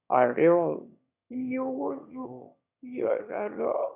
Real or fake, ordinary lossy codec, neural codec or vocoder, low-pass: fake; none; autoencoder, 22.05 kHz, a latent of 192 numbers a frame, VITS, trained on one speaker; 3.6 kHz